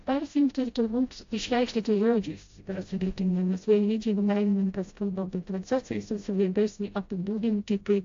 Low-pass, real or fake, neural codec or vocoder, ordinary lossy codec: 7.2 kHz; fake; codec, 16 kHz, 0.5 kbps, FreqCodec, smaller model; AAC, 48 kbps